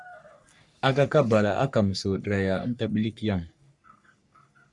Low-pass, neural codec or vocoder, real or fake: 10.8 kHz; codec, 44.1 kHz, 3.4 kbps, Pupu-Codec; fake